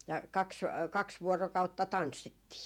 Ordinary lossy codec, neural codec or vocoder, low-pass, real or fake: MP3, 96 kbps; vocoder, 48 kHz, 128 mel bands, Vocos; 19.8 kHz; fake